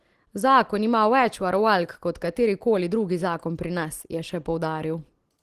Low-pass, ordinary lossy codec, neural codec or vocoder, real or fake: 14.4 kHz; Opus, 16 kbps; none; real